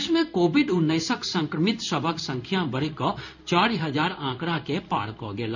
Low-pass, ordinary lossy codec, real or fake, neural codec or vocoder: 7.2 kHz; none; fake; codec, 16 kHz in and 24 kHz out, 1 kbps, XY-Tokenizer